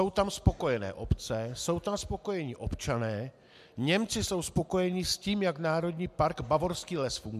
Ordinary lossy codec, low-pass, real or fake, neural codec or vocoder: MP3, 96 kbps; 14.4 kHz; real; none